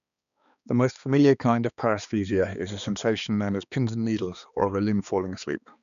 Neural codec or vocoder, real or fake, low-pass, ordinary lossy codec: codec, 16 kHz, 2 kbps, X-Codec, HuBERT features, trained on balanced general audio; fake; 7.2 kHz; none